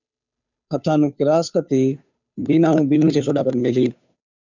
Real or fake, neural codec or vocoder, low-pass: fake; codec, 16 kHz, 2 kbps, FunCodec, trained on Chinese and English, 25 frames a second; 7.2 kHz